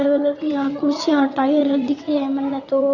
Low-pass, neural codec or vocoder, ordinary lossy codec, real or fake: 7.2 kHz; vocoder, 22.05 kHz, 80 mel bands, WaveNeXt; none; fake